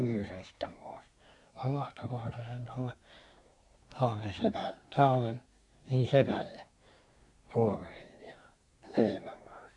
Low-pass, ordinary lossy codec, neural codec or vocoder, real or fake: 10.8 kHz; none; codec, 24 kHz, 1 kbps, SNAC; fake